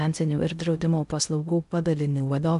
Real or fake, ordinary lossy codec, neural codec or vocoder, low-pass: fake; MP3, 96 kbps; codec, 16 kHz in and 24 kHz out, 0.6 kbps, FocalCodec, streaming, 2048 codes; 10.8 kHz